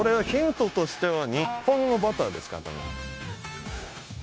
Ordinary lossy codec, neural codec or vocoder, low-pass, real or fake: none; codec, 16 kHz, 0.9 kbps, LongCat-Audio-Codec; none; fake